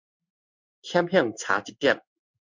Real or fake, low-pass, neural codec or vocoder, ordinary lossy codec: fake; 7.2 kHz; codec, 16 kHz in and 24 kHz out, 1 kbps, XY-Tokenizer; MP3, 64 kbps